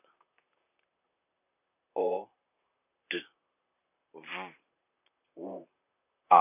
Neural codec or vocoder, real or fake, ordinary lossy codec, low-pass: vocoder, 44.1 kHz, 128 mel bands, Pupu-Vocoder; fake; none; 3.6 kHz